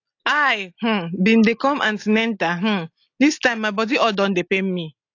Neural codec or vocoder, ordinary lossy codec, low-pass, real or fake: none; AAC, 48 kbps; 7.2 kHz; real